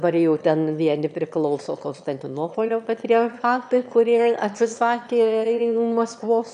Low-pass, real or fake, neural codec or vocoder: 9.9 kHz; fake; autoencoder, 22.05 kHz, a latent of 192 numbers a frame, VITS, trained on one speaker